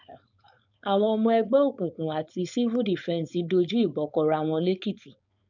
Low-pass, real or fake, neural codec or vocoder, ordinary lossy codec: 7.2 kHz; fake; codec, 16 kHz, 4.8 kbps, FACodec; none